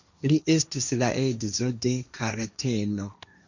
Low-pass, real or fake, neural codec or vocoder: 7.2 kHz; fake; codec, 16 kHz, 1.1 kbps, Voila-Tokenizer